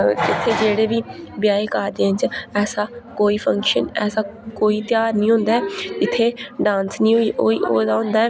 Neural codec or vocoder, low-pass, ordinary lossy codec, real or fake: none; none; none; real